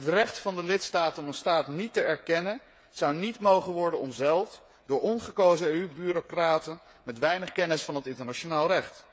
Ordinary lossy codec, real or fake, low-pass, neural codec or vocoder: none; fake; none; codec, 16 kHz, 8 kbps, FreqCodec, smaller model